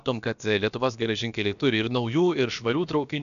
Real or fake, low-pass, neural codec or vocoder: fake; 7.2 kHz; codec, 16 kHz, about 1 kbps, DyCAST, with the encoder's durations